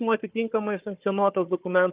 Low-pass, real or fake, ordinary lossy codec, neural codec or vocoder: 3.6 kHz; fake; Opus, 32 kbps; codec, 16 kHz, 4 kbps, FunCodec, trained on Chinese and English, 50 frames a second